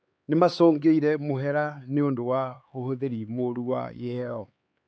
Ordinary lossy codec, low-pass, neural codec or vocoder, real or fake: none; none; codec, 16 kHz, 4 kbps, X-Codec, HuBERT features, trained on LibriSpeech; fake